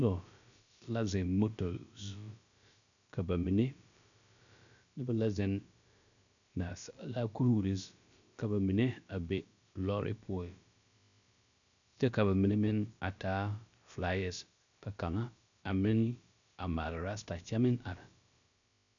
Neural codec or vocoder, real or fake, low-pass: codec, 16 kHz, about 1 kbps, DyCAST, with the encoder's durations; fake; 7.2 kHz